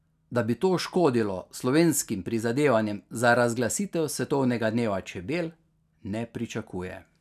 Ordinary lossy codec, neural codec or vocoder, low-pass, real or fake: none; none; 14.4 kHz; real